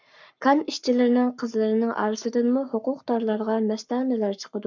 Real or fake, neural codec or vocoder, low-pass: fake; codec, 44.1 kHz, 7.8 kbps, Pupu-Codec; 7.2 kHz